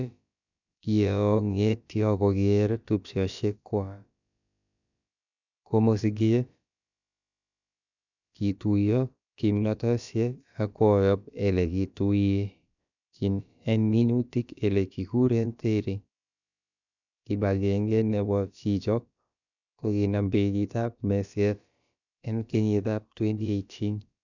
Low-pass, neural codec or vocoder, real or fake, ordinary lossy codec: 7.2 kHz; codec, 16 kHz, about 1 kbps, DyCAST, with the encoder's durations; fake; none